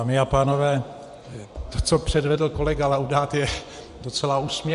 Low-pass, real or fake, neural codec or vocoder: 10.8 kHz; fake; vocoder, 24 kHz, 100 mel bands, Vocos